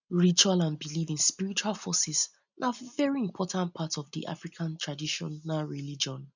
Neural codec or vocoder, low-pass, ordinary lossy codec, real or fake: none; 7.2 kHz; none; real